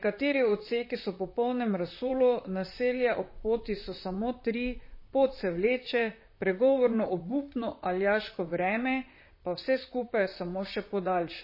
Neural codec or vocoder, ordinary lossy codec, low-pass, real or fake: vocoder, 44.1 kHz, 128 mel bands, Pupu-Vocoder; MP3, 24 kbps; 5.4 kHz; fake